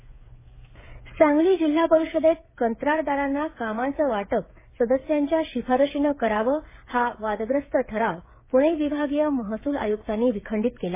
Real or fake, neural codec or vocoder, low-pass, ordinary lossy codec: fake; codec, 16 kHz, 8 kbps, FreqCodec, smaller model; 3.6 kHz; MP3, 16 kbps